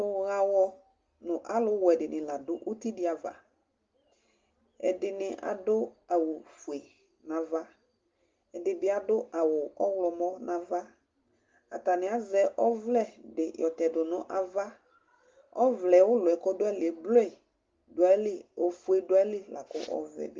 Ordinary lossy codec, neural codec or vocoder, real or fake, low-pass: Opus, 32 kbps; none; real; 7.2 kHz